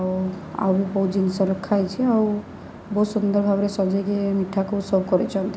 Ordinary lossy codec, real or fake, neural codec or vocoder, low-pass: none; real; none; none